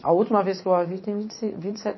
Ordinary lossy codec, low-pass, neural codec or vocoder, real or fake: MP3, 24 kbps; 7.2 kHz; vocoder, 44.1 kHz, 80 mel bands, Vocos; fake